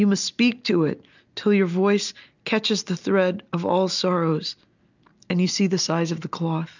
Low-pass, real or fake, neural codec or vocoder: 7.2 kHz; real; none